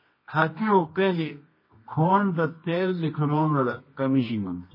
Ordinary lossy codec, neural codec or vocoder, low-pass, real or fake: MP3, 24 kbps; codec, 24 kHz, 0.9 kbps, WavTokenizer, medium music audio release; 5.4 kHz; fake